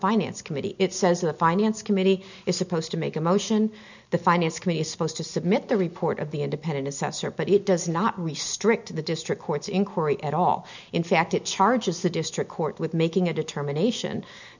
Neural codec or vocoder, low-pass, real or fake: none; 7.2 kHz; real